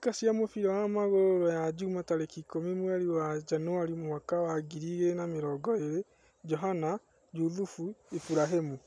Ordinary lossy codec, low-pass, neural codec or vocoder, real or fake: none; none; none; real